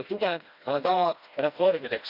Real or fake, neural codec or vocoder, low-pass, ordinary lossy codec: fake; codec, 16 kHz, 1 kbps, FreqCodec, smaller model; 5.4 kHz; none